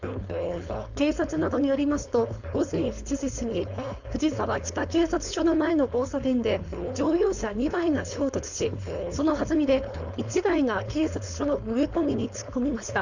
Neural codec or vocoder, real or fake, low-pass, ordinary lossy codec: codec, 16 kHz, 4.8 kbps, FACodec; fake; 7.2 kHz; none